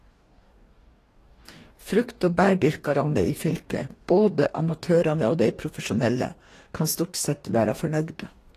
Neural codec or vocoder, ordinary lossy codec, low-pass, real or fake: codec, 44.1 kHz, 2.6 kbps, DAC; AAC, 48 kbps; 14.4 kHz; fake